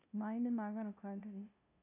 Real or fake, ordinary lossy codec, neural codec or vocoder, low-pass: fake; none; codec, 16 kHz, 0.5 kbps, FunCodec, trained on LibriTTS, 25 frames a second; 3.6 kHz